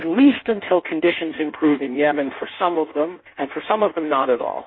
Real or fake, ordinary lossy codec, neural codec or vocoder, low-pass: fake; MP3, 24 kbps; codec, 16 kHz in and 24 kHz out, 1.1 kbps, FireRedTTS-2 codec; 7.2 kHz